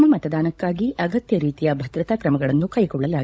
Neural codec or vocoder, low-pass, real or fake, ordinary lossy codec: codec, 16 kHz, 16 kbps, FunCodec, trained on LibriTTS, 50 frames a second; none; fake; none